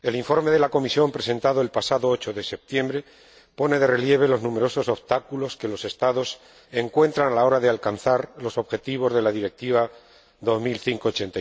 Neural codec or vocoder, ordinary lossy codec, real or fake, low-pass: none; none; real; none